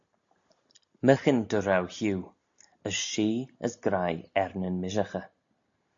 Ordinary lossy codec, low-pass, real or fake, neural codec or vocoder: AAC, 64 kbps; 7.2 kHz; real; none